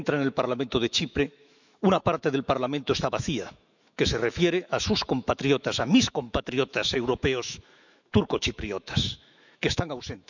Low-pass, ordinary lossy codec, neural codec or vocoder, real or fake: 7.2 kHz; none; autoencoder, 48 kHz, 128 numbers a frame, DAC-VAE, trained on Japanese speech; fake